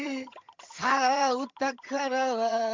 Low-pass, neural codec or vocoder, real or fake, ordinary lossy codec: 7.2 kHz; vocoder, 22.05 kHz, 80 mel bands, HiFi-GAN; fake; MP3, 64 kbps